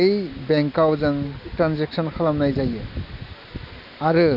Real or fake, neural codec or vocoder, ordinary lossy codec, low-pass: real; none; none; 5.4 kHz